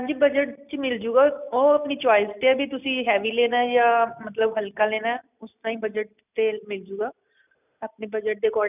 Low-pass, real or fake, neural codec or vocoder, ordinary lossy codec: 3.6 kHz; real; none; none